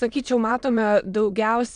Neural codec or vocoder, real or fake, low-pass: autoencoder, 22.05 kHz, a latent of 192 numbers a frame, VITS, trained on many speakers; fake; 9.9 kHz